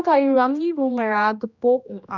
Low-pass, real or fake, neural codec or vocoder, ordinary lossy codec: 7.2 kHz; fake; codec, 16 kHz, 1 kbps, X-Codec, HuBERT features, trained on general audio; none